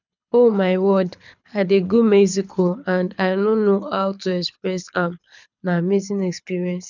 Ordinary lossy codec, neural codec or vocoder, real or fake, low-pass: none; codec, 24 kHz, 6 kbps, HILCodec; fake; 7.2 kHz